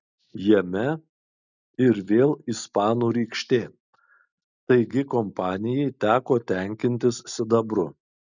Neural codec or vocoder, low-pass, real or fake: none; 7.2 kHz; real